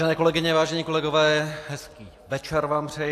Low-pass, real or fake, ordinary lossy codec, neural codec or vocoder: 14.4 kHz; real; AAC, 64 kbps; none